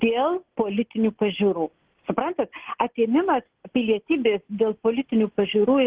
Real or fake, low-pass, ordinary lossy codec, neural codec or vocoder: real; 3.6 kHz; Opus, 16 kbps; none